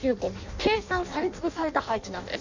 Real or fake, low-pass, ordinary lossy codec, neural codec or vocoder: fake; 7.2 kHz; none; codec, 16 kHz in and 24 kHz out, 0.6 kbps, FireRedTTS-2 codec